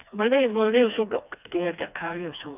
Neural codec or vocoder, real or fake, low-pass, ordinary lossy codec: codec, 16 kHz, 2 kbps, FreqCodec, smaller model; fake; 3.6 kHz; none